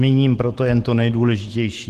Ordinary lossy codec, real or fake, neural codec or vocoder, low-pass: Opus, 16 kbps; fake; autoencoder, 48 kHz, 128 numbers a frame, DAC-VAE, trained on Japanese speech; 14.4 kHz